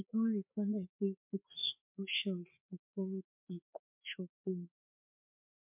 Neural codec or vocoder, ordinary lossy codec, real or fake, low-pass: codec, 16 kHz, 2 kbps, FreqCodec, larger model; MP3, 32 kbps; fake; 3.6 kHz